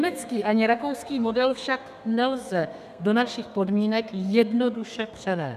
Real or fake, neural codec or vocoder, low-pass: fake; codec, 32 kHz, 1.9 kbps, SNAC; 14.4 kHz